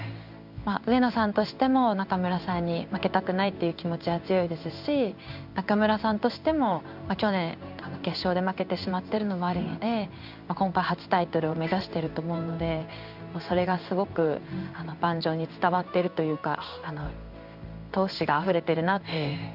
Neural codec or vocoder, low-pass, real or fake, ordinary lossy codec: codec, 16 kHz in and 24 kHz out, 1 kbps, XY-Tokenizer; 5.4 kHz; fake; none